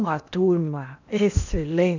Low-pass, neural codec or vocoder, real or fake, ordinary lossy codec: 7.2 kHz; codec, 16 kHz in and 24 kHz out, 0.8 kbps, FocalCodec, streaming, 65536 codes; fake; none